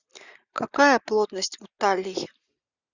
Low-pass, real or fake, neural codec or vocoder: 7.2 kHz; real; none